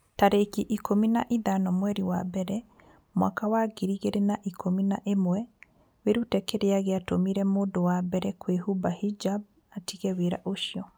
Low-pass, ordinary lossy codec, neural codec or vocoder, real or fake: none; none; none; real